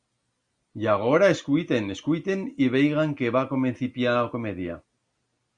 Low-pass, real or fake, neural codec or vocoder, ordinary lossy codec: 9.9 kHz; real; none; Opus, 64 kbps